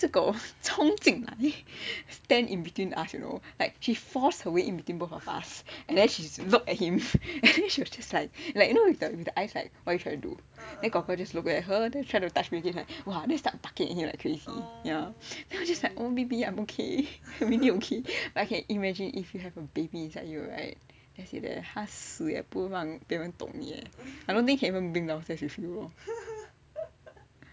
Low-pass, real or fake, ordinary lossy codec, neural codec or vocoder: none; real; none; none